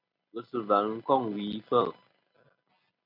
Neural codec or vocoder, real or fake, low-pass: none; real; 5.4 kHz